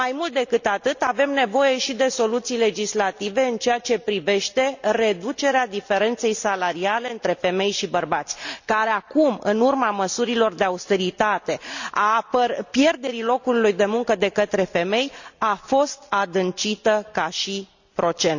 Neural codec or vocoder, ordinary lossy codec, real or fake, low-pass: none; none; real; 7.2 kHz